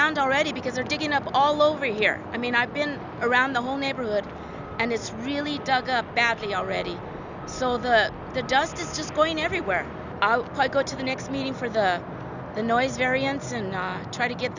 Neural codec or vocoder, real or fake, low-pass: none; real; 7.2 kHz